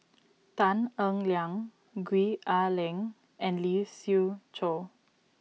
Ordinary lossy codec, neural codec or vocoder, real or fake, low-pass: none; none; real; none